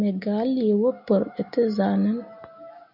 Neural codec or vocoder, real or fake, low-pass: none; real; 5.4 kHz